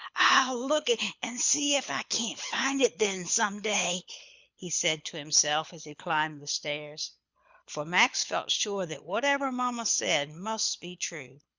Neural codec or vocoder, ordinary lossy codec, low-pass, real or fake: codec, 24 kHz, 6 kbps, HILCodec; Opus, 64 kbps; 7.2 kHz; fake